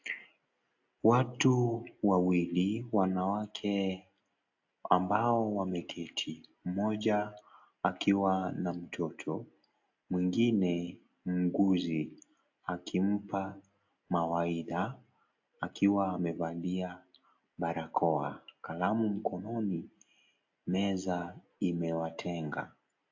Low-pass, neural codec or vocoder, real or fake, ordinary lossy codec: 7.2 kHz; none; real; AAC, 48 kbps